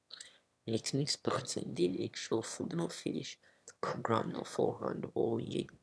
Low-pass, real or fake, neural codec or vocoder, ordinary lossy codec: none; fake; autoencoder, 22.05 kHz, a latent of 192 numbers a frame, VITS, trained on one speaker; none